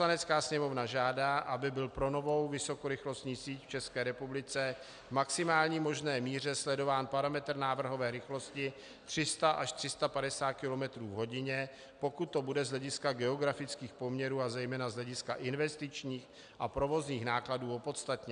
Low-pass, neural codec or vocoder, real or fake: 9.9 kHz; none; real